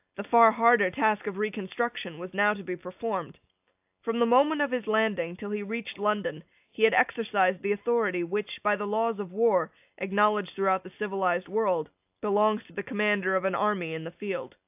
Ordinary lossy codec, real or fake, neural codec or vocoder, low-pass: AAC, 32 kbps; real; none; 3.6 kHz